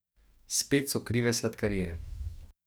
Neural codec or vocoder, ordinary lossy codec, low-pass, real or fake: codec, 44.1 kHz, 2.6 kbps, DAC; none; none; fake